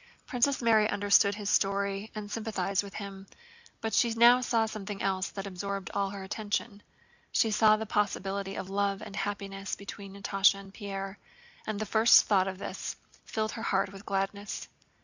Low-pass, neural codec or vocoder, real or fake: 7.2 kHz; none; real